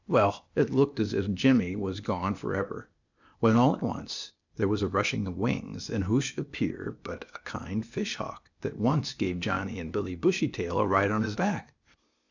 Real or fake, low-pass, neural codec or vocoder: fake; 7.2 kHz; codec, 16 kHz, 0.8 kbps, ZipCodec